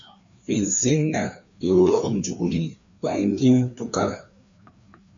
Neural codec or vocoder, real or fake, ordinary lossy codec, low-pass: codec, 16 kHz, 2 kbps, FreqCodec, larger model; fake; MP3, 64 kbps; 7.2 kHz